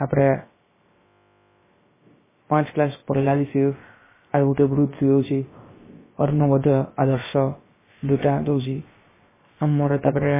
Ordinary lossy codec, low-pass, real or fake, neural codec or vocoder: MP3, 16 kbps; 3.6 kHz; fake; codec, 16 kHz, about 1 kbps, DyCAST, with the encoder's durations